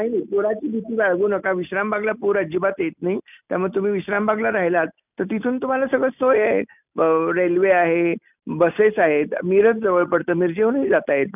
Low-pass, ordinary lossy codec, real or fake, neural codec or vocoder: 3.6 kHz; none; real; none